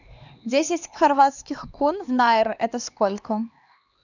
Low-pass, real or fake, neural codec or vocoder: 7.2 kHz; fake; codec, 16 kHz, 2 kbps, X-Codec, HuBERT features, trained on LibriSpeech